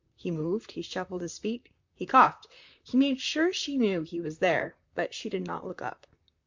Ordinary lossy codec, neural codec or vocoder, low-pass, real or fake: MP3, 64 kbps; vocoder, 44.1 kHz, 128 mel bands, Pupu-Vocoder; 7.2 kHz; fake